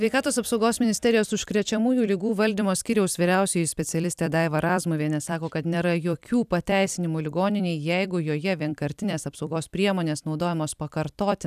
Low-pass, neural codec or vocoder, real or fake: 14.4 kHz; vocoder, 44.1 kHz, 128 mel bands every 256 samples, BigVGAN v2; fake